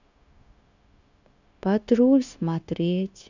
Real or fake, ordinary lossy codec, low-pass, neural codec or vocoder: fake; none; 7.2 kHz; codec, 16 kHz, 0.9 kbps, LongCat-Audio-Codec